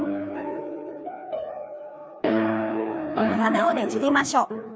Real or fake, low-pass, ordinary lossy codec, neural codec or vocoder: fake; none; none; codec, 16 kHz, 2 kbps, FreqCodec, larger model